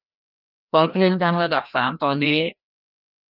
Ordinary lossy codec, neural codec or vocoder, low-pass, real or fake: none; codec, 16 kHz, 1 kbps, FreqCodec, larger model; 5.4 kHz; fake